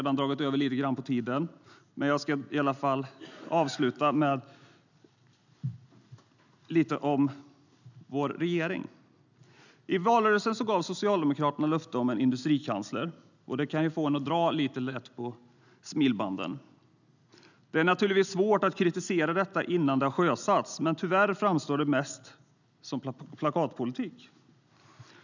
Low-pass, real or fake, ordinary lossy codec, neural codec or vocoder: 7.2 kHz; real; none; none